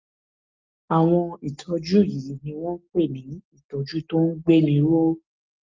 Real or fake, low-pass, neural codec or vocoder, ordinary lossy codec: real; 7.2 kHz; none; Opus, 24 kbps